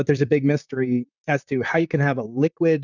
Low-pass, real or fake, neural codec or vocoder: 7.2 kHz; fake; vocoder, 44.1 kHz, 128 mel bands, Pupu-Vocoder